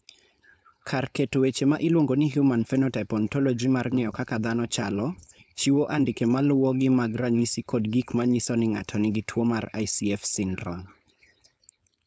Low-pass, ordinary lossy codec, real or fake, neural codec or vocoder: none; none; fake; codec, 16 kHz, 4.8 kbps, FACodec